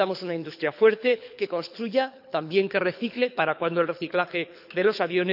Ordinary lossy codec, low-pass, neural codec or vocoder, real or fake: none; 5.4 kHz; codec, 24 kHz, 6 kbps, HILCodec; fake